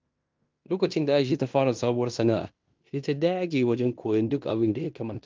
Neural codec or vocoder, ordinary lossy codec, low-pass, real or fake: codec, 16 kHz in and 24 kHz out, 0.9 kbps, LongCat-Audio-Codec, fine tuned four codebook decoder; Opus, 24 kbps; 7.2 kHz; fake